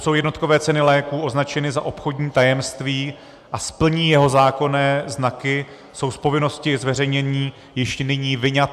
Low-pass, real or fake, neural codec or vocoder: 14.4 kHz; real; none